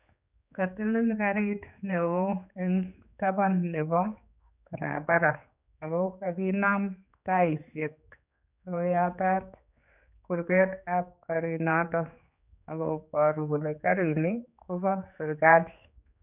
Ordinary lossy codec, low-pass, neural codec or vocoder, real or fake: none; 3.6 kHz; codec, 16 kHz, 4 kbps, X-Codec, HuBERT features, trained on general audio; fake